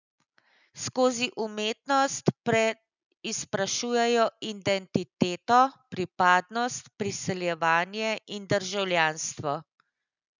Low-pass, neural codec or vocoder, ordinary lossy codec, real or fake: 7.2 kHz; none; none; real